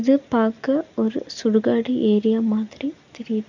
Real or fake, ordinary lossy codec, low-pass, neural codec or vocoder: real; none; 7.2 kHz; none